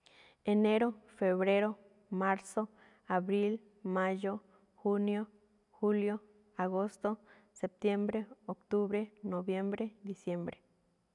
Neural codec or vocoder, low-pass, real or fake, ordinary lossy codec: none; 10.8 kHz; real; none